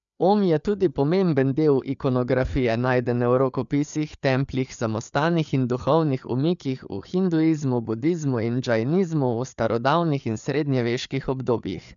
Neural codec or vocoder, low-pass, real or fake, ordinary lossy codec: codec, 16 kHz, 4 kbps, FreqCodec, larger model; 7.2 kHz; fake; none